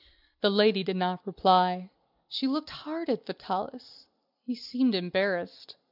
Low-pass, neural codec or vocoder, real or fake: 5.4 kHz; none; real